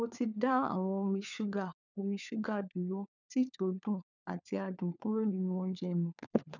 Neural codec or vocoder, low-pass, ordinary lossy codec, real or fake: codec, 16 kHz, 4 kbps, FunCodec, trained on LibriTTS, 50 frames a second; 7.2 kHz; none; fake